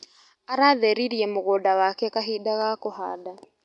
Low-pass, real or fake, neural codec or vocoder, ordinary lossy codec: 10.8 kHz; real; none; none